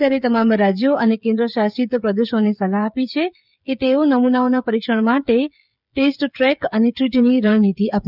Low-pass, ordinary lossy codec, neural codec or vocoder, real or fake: 5.4 kHz; none; codec, 16 kHz, 16 kbps, FreqCodec, smaller model; fake